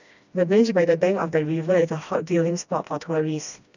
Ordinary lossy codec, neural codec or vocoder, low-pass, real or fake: none; codec, 16 kHz, 1 kbps, FreqCodec, smaller model; 7.2 kHz; fake